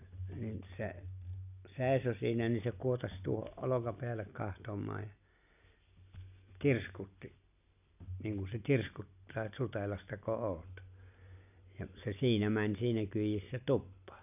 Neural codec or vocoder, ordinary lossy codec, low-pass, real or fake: none; none; 3.6 kHz; real